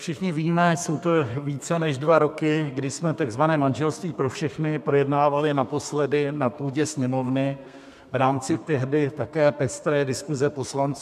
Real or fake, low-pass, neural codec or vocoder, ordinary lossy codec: fake; 14.4 kHz; codec, 32 kHz, 1.9 kbps, SNAC; MP3, 96 kbps